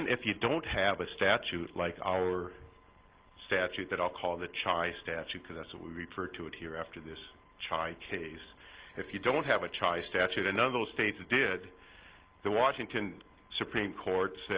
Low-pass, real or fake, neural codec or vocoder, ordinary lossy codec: 3.6 kHz; real; none; Opus, 16 kbps